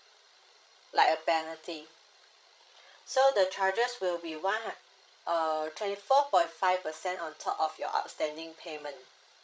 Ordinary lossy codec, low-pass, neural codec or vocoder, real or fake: none; none; codec, 16 kHz, 16 kbps, FreqCodec, larger model; fake